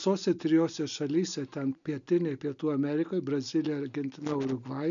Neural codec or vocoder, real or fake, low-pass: none; real; 7.2 kHz